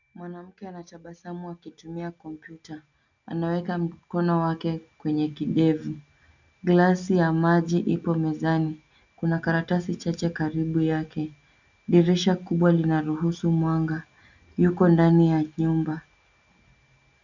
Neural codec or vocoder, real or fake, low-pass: none; real; 7.2 kHz